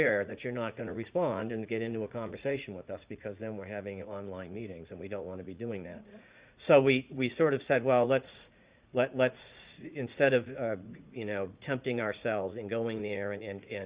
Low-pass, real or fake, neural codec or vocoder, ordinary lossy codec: 3.6 kHz; fake; vocoder, 44.1 kHz, 80 mel bands, Vocos; Opus, 64 kbps